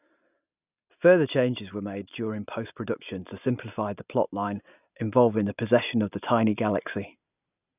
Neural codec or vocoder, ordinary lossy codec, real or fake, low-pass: none; none; real; 3.6 kHz